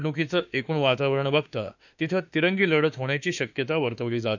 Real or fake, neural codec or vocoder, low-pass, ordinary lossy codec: fake; autoencoder, 48 kHz, 32 numbers a frame, DAC-VAE, trained on Japanese speech; 7.2 kHz; none